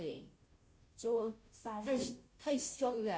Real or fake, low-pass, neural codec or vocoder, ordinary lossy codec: fake; none; codec, 16 kHz, 0.5 kbps, FunCodec, trained on Chinese and English, 25 frames a second; none